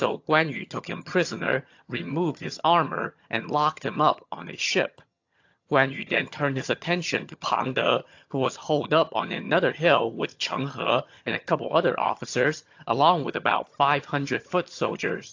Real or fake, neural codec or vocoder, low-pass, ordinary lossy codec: fake; vocoder, 22.05 kHz, 80 mel bands, HiFi-GAN; 7.2 kHz; AAC, 48 kbps